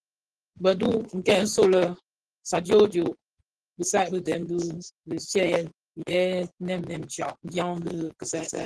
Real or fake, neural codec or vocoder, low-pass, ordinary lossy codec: fake; vocoder, 24 kHz, 100 mel bands, Vocos; 10.8 kHz; Opus, 16 kbps